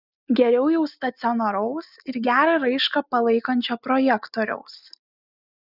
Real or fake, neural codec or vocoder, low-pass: real; none; 5.4 kHz